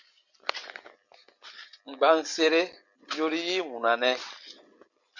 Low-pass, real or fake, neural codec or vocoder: 7.2 kHz; real; none